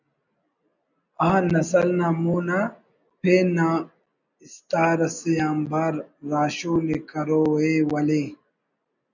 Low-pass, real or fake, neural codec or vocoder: 7.2 kHz; real; none